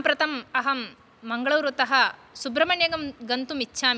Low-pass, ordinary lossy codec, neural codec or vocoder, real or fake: none; none; none; real